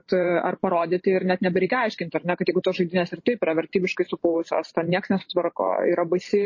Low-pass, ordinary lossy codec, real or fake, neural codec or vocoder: 7.2 kHz; MP3, 32 kbps; fake; vocoder, 44.1 kHz, 128 mel bands every 512 samples, BigVGAN v2